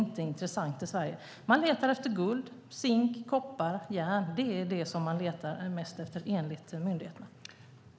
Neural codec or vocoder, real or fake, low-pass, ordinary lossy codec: none; real; none; none